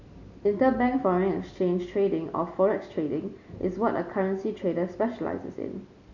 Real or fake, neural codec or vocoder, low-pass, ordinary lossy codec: real; none; 7.2 kHz; none